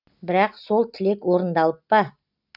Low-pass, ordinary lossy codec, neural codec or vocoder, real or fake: 5.4 kHz; none; none; real